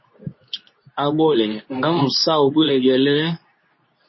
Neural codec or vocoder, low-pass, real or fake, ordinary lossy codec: codec, 24 kHz, 0.9 kbps, WavTokenizer, medium speech release version 2; 7.2 kHz; fake; MP3, 24 kbps